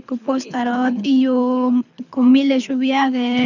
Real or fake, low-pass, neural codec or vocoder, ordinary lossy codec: fake; 7.2 kHz; codec, 24 kHz, 6 kbps, HILCodec; none